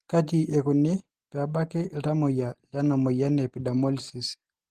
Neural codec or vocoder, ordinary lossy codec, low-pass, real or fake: none; Opus, 16 kbps; 14.4 kHz; real